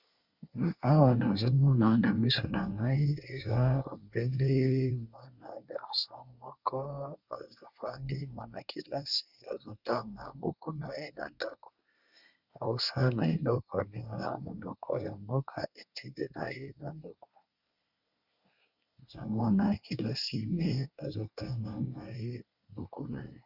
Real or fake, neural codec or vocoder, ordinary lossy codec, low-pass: fake; codec, 24 kHz, 1 kbps, SNAC; Opus, 64 kbps; 5.4 kHz